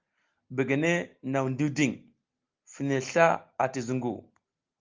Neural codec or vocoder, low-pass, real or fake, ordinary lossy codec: none; 7.2 kHz; real; Opus, 32 kbps